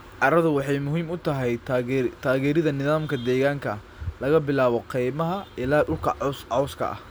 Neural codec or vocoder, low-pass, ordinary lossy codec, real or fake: none; none; none; real